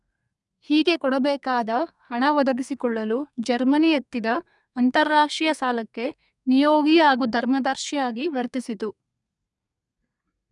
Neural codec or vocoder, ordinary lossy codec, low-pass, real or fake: codec, 32 kHz, 1.9 kbps, SNAC; none; 10.8 kHz; fake